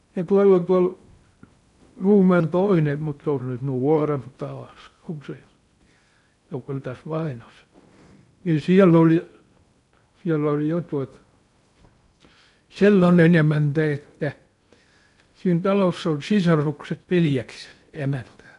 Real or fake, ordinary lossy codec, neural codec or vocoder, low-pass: fake; none; codec, 16 kHz in and 24 kHz out, 0.6 kbps, FocalCodec, streaming, 2048 codes; 10.8 kHz